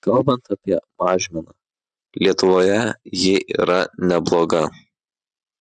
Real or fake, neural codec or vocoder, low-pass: real; none; 10.8 kHz